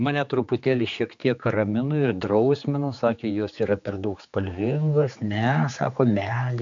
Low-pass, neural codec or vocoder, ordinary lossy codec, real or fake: 7.2 kHz; codec, 16 kHz, 4 kbps, X-Codec, HuBERT features, trained on general audio; MP3, 64 kbps; fake